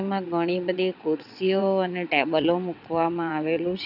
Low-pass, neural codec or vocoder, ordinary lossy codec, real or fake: 5.4 kHz; vocoder, 22.05 kHz, 80 mel bands, WaveNeXt; none; fake